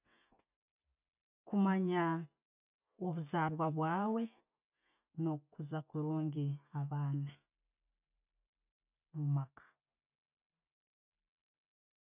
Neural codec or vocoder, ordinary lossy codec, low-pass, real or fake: none; none; 3.6 kHz; real